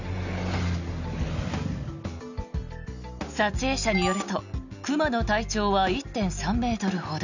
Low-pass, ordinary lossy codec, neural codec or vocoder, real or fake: 7.2 kHz; none; none; real